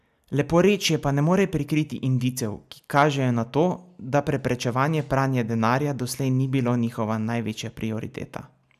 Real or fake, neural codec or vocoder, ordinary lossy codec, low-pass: real; none; none; 14.4 kHz